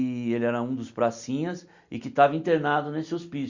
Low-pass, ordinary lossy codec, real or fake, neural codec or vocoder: 7.2 kHz; Opus, 64 kbps; real; none